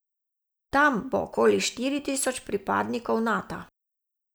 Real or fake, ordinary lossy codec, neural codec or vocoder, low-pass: real; none; none; none